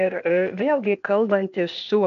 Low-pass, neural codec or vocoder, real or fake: 7.2 kHz; codec, 16 kHz, 0.8 kbps, ZipCodec; fake